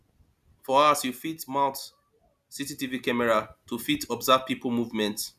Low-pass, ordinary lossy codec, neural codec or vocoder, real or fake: 14.4 kHz; none; none; real